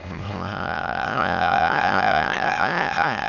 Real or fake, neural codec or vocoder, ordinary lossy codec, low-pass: fake; autoencoder, 22.05 kHz, a latent of 192 numbers a frame, VITS, trained on many speakers; none; 7.2 kHz